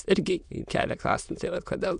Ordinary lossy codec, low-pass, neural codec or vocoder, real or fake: AAC, 96 kbps; 9.9 kHz; autoencoder, 22.05 kHz, a latent of 192 numbers a frame, VITS, trained on many speakers; fake